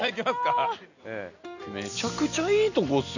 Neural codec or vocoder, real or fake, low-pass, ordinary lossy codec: none; real; 7.2 kHz; none